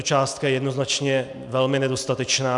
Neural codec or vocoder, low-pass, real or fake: none; 10.8 kHz; real